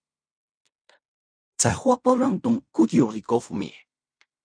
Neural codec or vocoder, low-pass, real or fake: codec, 16 kHz in and 24 kHz out, 0.4 kbps, LongCat-Audio-Codec, fine tuned four codebook decoder; 9.9 kHz; fake